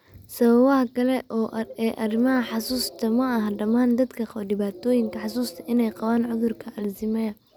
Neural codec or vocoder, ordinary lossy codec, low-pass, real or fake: none; none; none; real